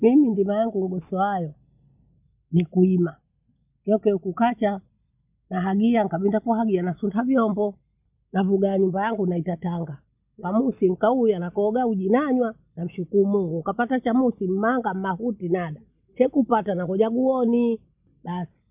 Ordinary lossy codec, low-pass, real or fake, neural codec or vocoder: none; 3.6 kHz; real; none